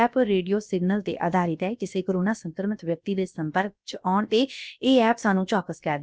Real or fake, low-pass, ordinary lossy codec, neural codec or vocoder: fake; none; none; codec, 16 kHz, about 1 kbps, DyCAST, with the encoder's durations